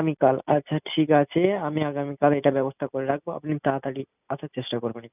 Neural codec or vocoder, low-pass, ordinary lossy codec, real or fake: none; 3.6 kHz; none; real